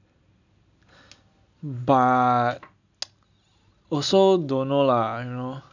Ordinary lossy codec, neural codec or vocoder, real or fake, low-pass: none; none; real; 7.2 kHz